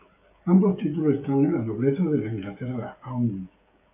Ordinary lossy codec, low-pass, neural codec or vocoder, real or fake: AAC, 24 kbps; 3.6 kHz; codec, 16 kHz, 16 kbps, FreqCodec, smaller model; fake